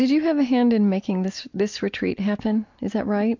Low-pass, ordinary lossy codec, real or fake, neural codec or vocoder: 7.2 kHz; MP3, 48 kbps; real; none